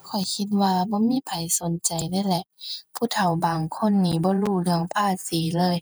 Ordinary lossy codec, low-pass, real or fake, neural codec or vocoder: none; none; fake; vocoder, 48 kHz, 128 mel bands, Vocos